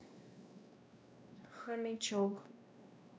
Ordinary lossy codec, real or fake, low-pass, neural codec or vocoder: none; fake; none; codec, 16 kHz, 1 kbps, X-Codec, WavLM features, trained on Multilingual LibriSpeech